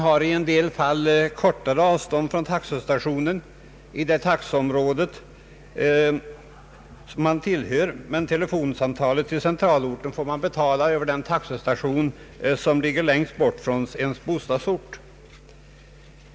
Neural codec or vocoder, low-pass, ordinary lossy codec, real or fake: none; none; none; real